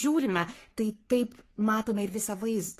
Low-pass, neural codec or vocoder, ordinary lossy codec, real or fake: 14.4 kHz; codec, 44.1 kHz, 3.4 kbps, Pupu-Codec; AAC, 48 kbps; fake